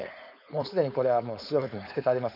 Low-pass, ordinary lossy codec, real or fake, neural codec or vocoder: 5.4 kHz; none; fake; codec, 16 kHz, 4.8 kbps, FACodec